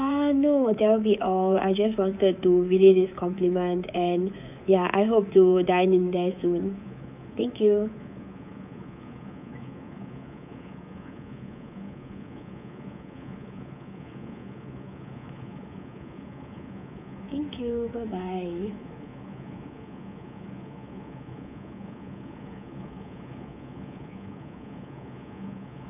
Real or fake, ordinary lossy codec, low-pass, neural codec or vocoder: fake; none; 3.6 kHz; codec, 24 kHz, 3.1 kbps, DualCodec